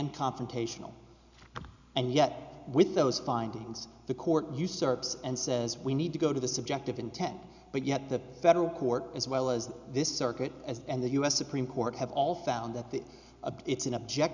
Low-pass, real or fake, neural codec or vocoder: 7.2 kHz; real; none